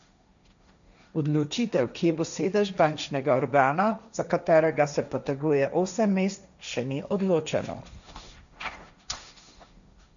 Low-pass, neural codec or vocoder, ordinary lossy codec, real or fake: 7.2 kHz; codec, 16 kHz, 1.1 kbps, Voila-Tokenizer; none; fake